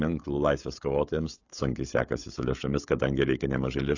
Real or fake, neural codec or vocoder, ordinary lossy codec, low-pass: fake; codec, 16 kHz, 16 kbps, FreqCodec, smaller model; AAC, 48 kbps; 7.2 kHz